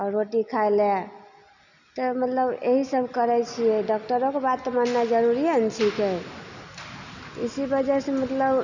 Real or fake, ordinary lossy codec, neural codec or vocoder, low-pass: real; none; none; 7.2 kHz